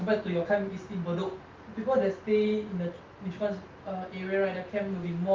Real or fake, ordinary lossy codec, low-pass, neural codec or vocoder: real; Opus, 32 kbps; 7.2 kHz; none